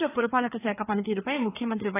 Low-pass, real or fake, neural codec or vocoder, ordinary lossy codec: 3.6 kHz; fake; codec, 16 kHz, 4 kbps, FreqCodec, larger model; AAC, 16 kbps